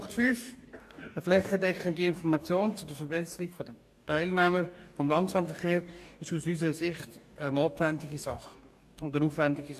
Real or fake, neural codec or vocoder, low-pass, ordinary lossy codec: fake; codec, 44.1 kHz, 2.6 kbps, DAC; 14.4 kHz; AAC, 96 kbps